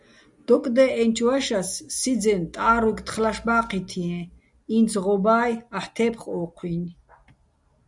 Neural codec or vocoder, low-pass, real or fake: none; 10.8 kHz; real